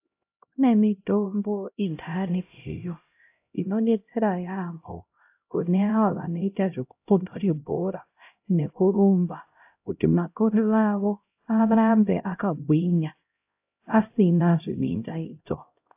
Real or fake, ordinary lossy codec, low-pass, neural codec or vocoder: fake; AAC, 32 kbps; 3.6 kHz; codec, 16 kHz, 0.5 kbps, X-Codec, HuBERT features, trained on LibriSpeech